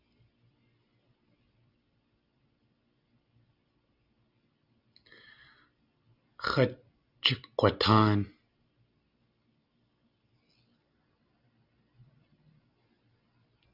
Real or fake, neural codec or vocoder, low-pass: real; none; 5.4 kHz